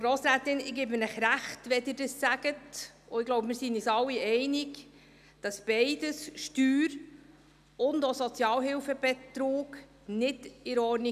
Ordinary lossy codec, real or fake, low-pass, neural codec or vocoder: none; real; 14.4 kHz; none